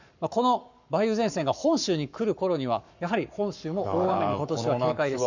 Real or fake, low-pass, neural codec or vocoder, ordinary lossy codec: fake; 7.2 kHz; codec, 44.1 kHz, 7.8 kbps, Pupu-Codec; none